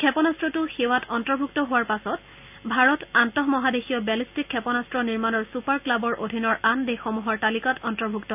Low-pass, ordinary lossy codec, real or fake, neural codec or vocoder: 3.6 kHz; none; real; none